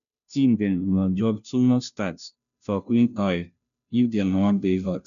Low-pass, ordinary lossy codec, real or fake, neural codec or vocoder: 7.2 kHz; none; fake; codec, 16 kHz, 0.5 kbps, FunCodec, trained on Chinese and English, 25 frames a second